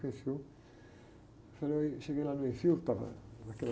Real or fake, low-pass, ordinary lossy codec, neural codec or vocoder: real; none; none; none